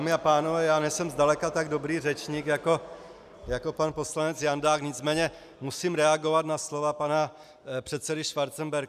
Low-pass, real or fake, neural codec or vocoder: 14.4 kHz; real; none